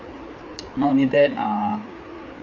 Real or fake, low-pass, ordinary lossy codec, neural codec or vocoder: fake; 7.2 kHz; MP3, 48 kbps; codec, 16 kHz, 4 kbps, FreqCodec, larger model